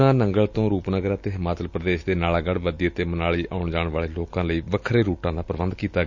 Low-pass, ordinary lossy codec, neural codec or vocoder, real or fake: 7.2 kHz; none; none; real